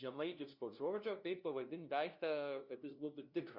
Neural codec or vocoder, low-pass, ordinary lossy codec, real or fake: codec, 16 kHz, 0.5 kbps, FunCodec, trained on LibriTTS, 25 frames a second; 5.4 kHz; Opus, 64 kbps; fake